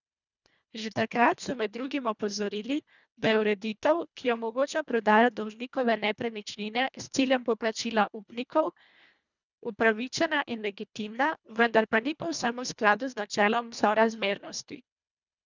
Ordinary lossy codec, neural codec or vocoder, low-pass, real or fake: none; codec, 24 kHz, 1.5 kbps, HILCodec; 7.2 kHz; fake